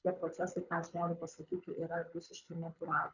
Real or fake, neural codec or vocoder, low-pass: fake; codec, 24 kHz, 6 kbps, HILCodec; 7.2 kHz